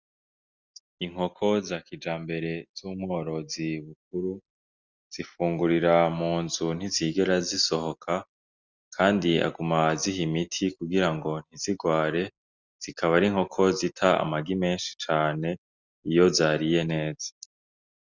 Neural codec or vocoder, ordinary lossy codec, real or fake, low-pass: none; Opus, 64 kbps; real; 7.2 kHz